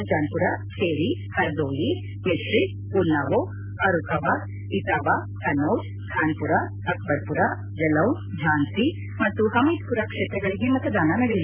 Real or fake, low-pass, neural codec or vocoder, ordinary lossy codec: real; 3.6 kHz; none; Opus, 64 kbps